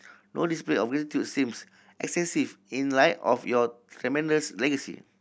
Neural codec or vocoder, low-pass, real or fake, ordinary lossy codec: none; none; real; none